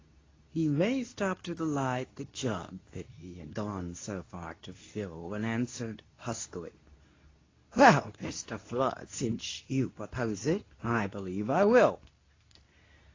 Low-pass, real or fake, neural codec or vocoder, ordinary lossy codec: 7.2 kHz; fake; codec, 24 kHz, 0.9 kbps, WavTokenizer, medium speech release version 2; AAC, 32 kbps